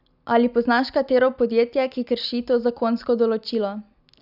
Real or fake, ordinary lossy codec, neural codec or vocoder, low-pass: real; none; none; 5.4 kHz